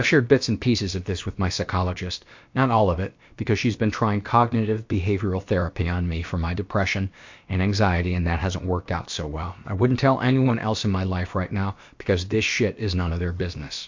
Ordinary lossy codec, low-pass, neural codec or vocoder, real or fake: MP3, 48 kbps; 7.2 kHz; codec, 16 kHz, about 1 kbps, DyCAST, with the encoder's durations; fake